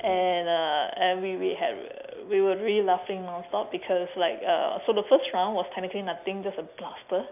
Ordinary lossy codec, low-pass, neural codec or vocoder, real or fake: none; 3.6 kHz; none; real